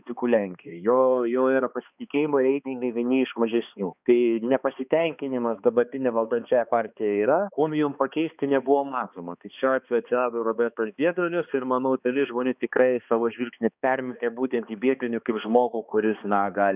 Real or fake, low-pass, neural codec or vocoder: fake; 3.6 kHz; codec, 16 kHz, 2 kbps, X-Codec, HuBERT features, trained on balanced general audio